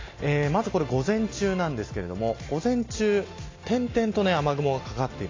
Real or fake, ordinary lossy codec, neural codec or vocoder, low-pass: real; AAC, 32 kbps; none; 7.2 kHz